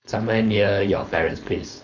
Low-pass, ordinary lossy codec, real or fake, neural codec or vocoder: 7.2 kHz; none; fake; codec, 16 kHz, 4.8 kbps, FACodec